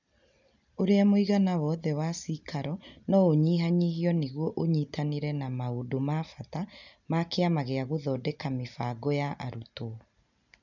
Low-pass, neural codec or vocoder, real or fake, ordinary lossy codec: 7.2 kHz; none; real; none